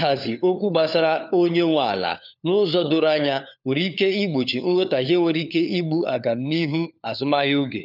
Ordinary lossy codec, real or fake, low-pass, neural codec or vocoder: none; fake; 5.4 kHz; codec, 16 kHz, 4 kbps, FunCodec, trained on LibriTTS, 50 frames a second